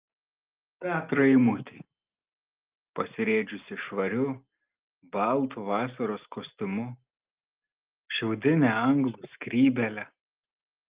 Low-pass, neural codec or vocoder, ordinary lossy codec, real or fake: 3.6 kHz; none; Opus, 32 kbps; real